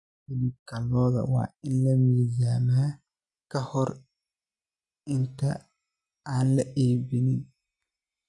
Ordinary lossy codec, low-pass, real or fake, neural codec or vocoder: none; 10.8 kHz; real; none